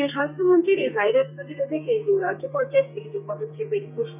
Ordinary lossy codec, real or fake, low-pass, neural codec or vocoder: none; fake; 3.6 kHz; codec, 32 kHz, 1.9 kbps, SNAC